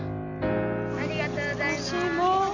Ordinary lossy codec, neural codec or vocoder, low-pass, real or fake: none; none; 7.2 kHz; real